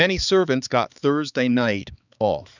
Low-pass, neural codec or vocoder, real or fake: 7.2 kHz; codec, 16 kHz, 4 kbps, X-Codec, HuBERT features, trained on balanced general audio; fake